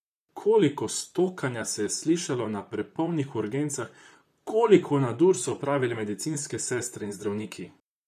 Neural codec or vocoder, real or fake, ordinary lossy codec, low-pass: vocoder, 44.1 kHz, 128 mel bands, Pupu-Vocoder; fake; none; 14.4 kHz